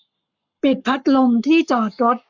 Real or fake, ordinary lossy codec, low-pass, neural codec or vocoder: fake; none; 7.2 kHz; codec, 44.1 kHz, 7.8 kbps, Pupu-Codec